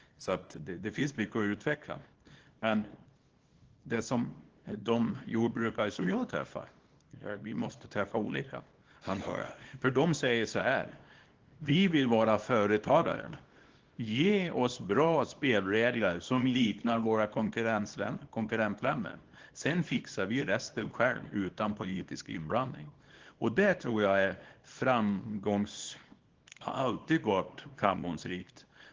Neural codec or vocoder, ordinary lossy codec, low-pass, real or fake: codec, 24 kHz, 0.9 kbps, WavTokenizer, small release; Opus, 16 kbps; 7.2 kHz; fake